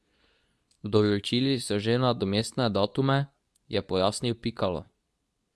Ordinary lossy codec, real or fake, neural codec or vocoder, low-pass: none; fake; codec, 24 kHz, 0.9 kbps, WavTokenizer, medium speech release version 2; none